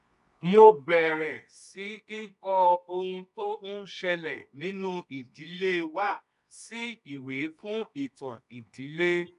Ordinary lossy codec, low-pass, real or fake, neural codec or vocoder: MP3, 96 kbps; 10.8 kHz; fake; codec, 24 kHz, 0.9 kbps, WavTokenizer, medium music audio release